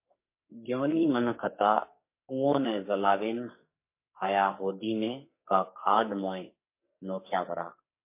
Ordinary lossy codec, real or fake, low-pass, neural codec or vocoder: MP3, 24 kbps; fake; 3.6 kHz; codec, 44.1 kHz, 2.6 kbps, SNAC